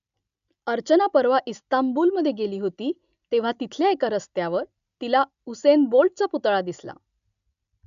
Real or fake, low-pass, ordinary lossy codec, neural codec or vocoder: real; 7.2 kHz; none; none